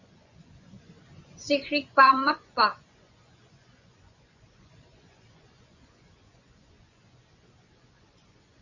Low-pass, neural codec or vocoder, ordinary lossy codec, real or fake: 7.2 kHz; vocoder, 24 kHz, 100 mel bands, Vocos; Opus, 64 kbps; fake